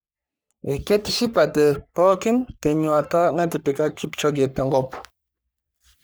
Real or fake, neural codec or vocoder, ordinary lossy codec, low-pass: fake; codec, 44.1 kHz, 3.4 kbps, Pupu-Codec; none; none